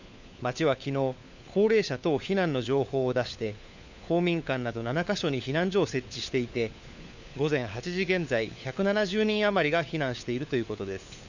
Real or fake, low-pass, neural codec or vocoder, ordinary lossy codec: fake; 7.2 kHz; codec, 16 kHz, 4 kbps, FunCodec, trained on LibriTTS, 50 frames a second; none